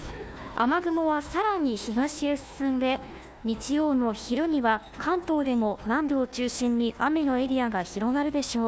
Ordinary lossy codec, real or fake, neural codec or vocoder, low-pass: none; fake; codec, 16 kHz, 1 kbps, FunCodec, trained on Chinese and English, 50 frames a second; none